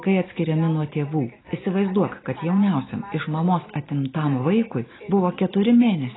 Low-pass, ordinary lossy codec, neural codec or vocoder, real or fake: 7.2 kHz; AAC, 16 kbps; none; real